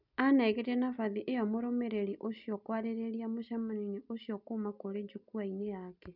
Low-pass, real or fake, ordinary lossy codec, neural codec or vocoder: 5.4 kHz; real; none; none